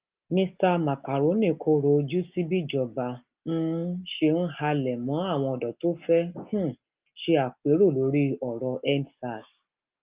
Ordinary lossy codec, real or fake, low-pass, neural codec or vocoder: Opus, 32 kbps; real; 3.6 kHz; none